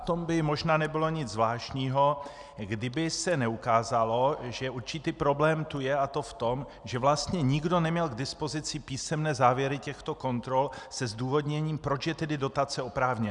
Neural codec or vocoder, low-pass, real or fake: none; 10.8 kHz; real